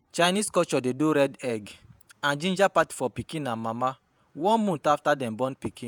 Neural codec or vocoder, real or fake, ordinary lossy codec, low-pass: vocoder, 48 kHz, 128 mel bands, Vocos; fake; none; none